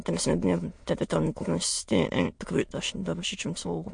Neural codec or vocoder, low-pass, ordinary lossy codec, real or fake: autoencoder, 22.05 kHz, a latent of 192 numbers a frame, VITS, trained on many speakers; 9.9 kHz; MP3, 48 kbps; fake